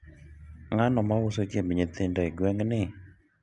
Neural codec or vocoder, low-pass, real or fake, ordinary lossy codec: none; 10.8 kHz; real; none